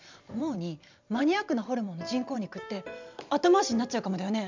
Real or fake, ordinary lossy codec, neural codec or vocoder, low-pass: fake; MP3, 64 kbps; vocoder, 44.1 kHz, 128 mel bands every 512 samples, BigVGAN v2; 7.2 kHz